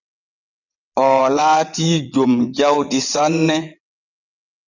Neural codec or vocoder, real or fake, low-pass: vocoder, 22.05 kHz, 80 mel bands, WaveNeXt; fake; 7.2 kHz